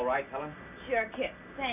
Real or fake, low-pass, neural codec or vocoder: real; 3.6 kHz; none